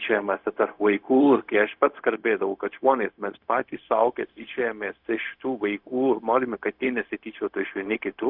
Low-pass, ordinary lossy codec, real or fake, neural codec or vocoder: 5.4 kHz; Opus, 64 kbps; fake; codec, 16 kHz, 0.4 kbps, LongCat-Audio-Codec